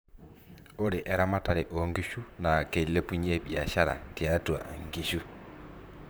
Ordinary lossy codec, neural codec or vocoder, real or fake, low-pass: none; vocoder, 44.1 kHz, 128 mel bands, Pupu-Vocoder; fake; none